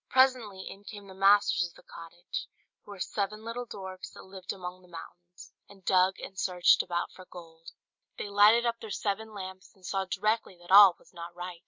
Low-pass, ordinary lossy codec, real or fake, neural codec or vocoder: 7.2 kHz; MP3, 64 kbps; real; none